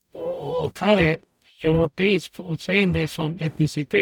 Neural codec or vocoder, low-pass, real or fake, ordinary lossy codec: codec, 44.1 kHz, 0.9 kbps, DAC; 19.8 kHz; fake; none